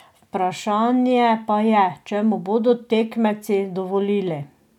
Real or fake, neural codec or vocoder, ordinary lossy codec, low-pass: real; none; none; 19.8 kHz